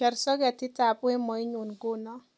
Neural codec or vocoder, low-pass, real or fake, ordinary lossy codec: none; none; real; none